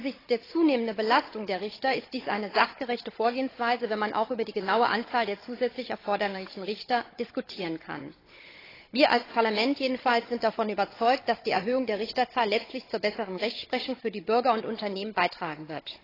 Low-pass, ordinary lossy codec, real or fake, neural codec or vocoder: 5.4 kHz; AAC, 24 kbps; fake; codec, 16 kHz, 16 kbps, FunCodec, trained on Chinese and English, 50 frames a second